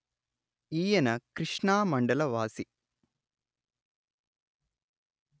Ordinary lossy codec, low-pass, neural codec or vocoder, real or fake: none; none; none; real